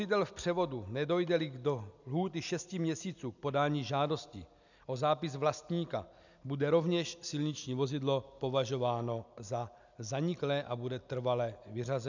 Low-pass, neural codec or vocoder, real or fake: 7.2 kHz; none; real